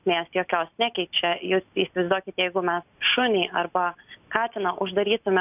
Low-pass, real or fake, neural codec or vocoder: 3.6 kHz; real; none